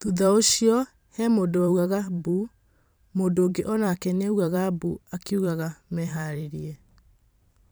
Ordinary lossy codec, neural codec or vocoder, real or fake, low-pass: none; vocoder, 44.1 kHz, 128 mel bands every 512 samples, BigVGAN v2; fake; none